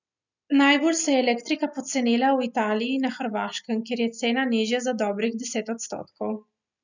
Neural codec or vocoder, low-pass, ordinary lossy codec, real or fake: none; 7.2 kHz; none; real